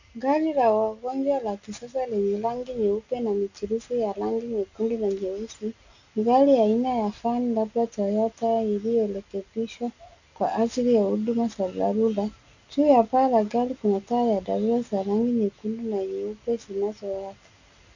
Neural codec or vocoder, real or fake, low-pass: none; real; 7.2 kHz